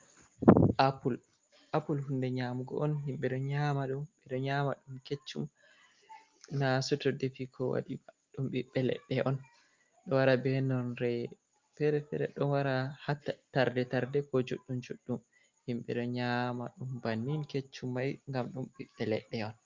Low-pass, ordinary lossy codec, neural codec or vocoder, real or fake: 7.2 kHz; Opus, 32 kbps; none; real